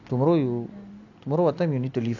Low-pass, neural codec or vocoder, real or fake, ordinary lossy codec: 7.2 kHz; none; real; none